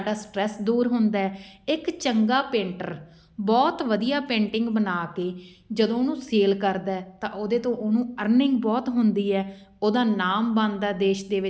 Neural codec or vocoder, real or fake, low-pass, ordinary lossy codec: none; real; none; none